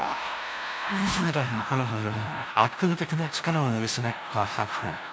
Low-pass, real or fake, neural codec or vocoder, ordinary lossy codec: none; fake; codec, 16 kHz, 0.5 kbps, FunCodec, trained on LibriTTS, 25 frames a second; none